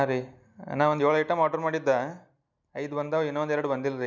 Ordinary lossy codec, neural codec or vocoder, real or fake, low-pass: none; none; real; 7.2 kHz